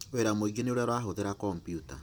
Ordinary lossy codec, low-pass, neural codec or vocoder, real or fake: none; none; vocoder, 44.1 kHz, 128 mel bands every 256 samples, BigVGAN v2; fake